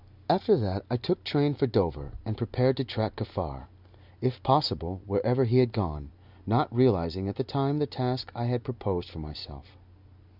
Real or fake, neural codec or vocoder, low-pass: real; none; 5.4 kHz